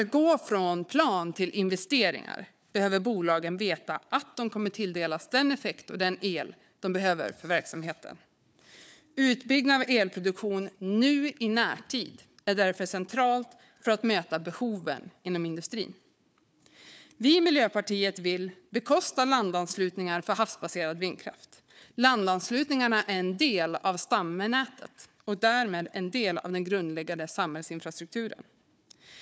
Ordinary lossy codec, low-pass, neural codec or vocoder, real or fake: none; none; codec, 16 kHz, 4 kbps, FunCodec, trained on Chinese and English, 50 frames a second; fake